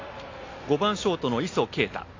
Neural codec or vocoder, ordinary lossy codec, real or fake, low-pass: none; AAC, 32 kbps; real; 7.2 kHz